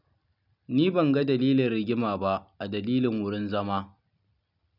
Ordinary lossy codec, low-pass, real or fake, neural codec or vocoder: none; 5.4 kHz; real; none